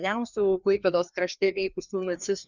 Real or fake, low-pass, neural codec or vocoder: fake; 7.2 kHz; codec, 16 kHz, 2 kbps, FreqCodec, larger model